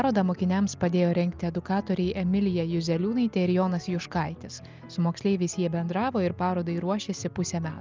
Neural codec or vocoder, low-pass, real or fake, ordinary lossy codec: none; 7.2 kHz; real; Opus, 24 kbps